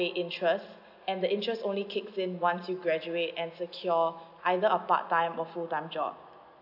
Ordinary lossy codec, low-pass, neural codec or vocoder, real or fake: none; 5.4 kHz; none; real